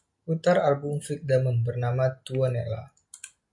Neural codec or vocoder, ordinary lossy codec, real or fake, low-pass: none; MP3, 64 kbps; real; 10.8 kHz